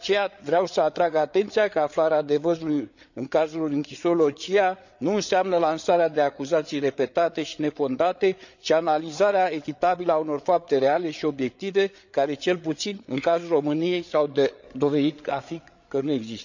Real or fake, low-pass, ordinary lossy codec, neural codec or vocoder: fake; 7.2 kHz; none; codec, 16 kHz, 8 kbps, FreqCodec, larger model